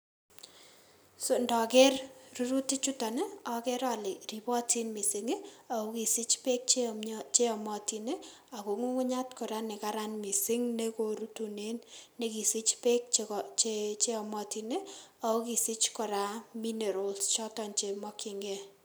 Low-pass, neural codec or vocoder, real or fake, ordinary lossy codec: none; none; real; none